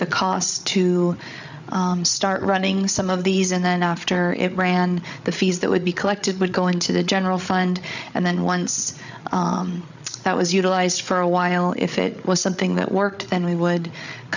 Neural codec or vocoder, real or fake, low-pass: codec, 16 kHz, 8 kbps, FreqCodec, larger model; fake; 7.2 kHz